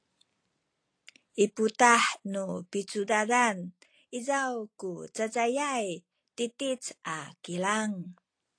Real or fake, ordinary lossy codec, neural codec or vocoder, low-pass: real; AAC, 48 kbps; none; 9.9 kHz